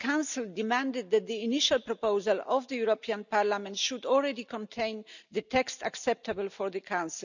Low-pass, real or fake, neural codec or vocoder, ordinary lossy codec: 7.2 kHz; real; none; none